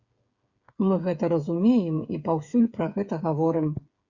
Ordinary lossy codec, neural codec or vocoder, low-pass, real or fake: Opus, 64 kbps; codec, 16 kHz, 8 kbps, FreqCodec, smaller model; 7.2 kHz; fake